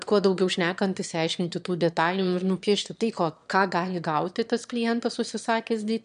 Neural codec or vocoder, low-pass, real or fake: autoencoder, 22.05 kHz, a latent of 192 numbers a frame, VITS, trained on one speaker; 9.9 kHz; fake